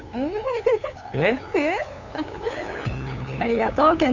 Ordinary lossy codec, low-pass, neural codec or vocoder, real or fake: none; 7.2 kHz; codec, 16 kHz, 8 kbps, FunCodec, trained on LibriTTS, 25 frames a second; fake